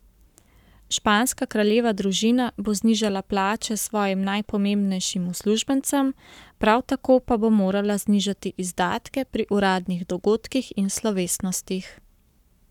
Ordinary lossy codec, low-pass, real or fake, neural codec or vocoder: none; 19.8 kHz; fake; codec, 44.1 kHz, 7.8 kbps, Pupu-Codec